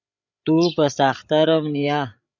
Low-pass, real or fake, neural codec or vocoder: 7.2 kHz; fake; codec, 16 kHz, 16 kbps, FreqCodec, larger model